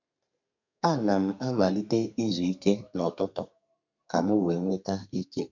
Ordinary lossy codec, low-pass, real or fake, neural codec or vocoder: none; 7.2 kHz; fake; codec, 32 kHz, 1.9 kbps, SNAC